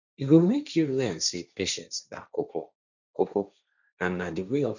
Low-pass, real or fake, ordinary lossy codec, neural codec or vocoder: 7.2 kHz; fake; none; codec, 16 kHz, 1.1 kbps, Voila-Tokenizer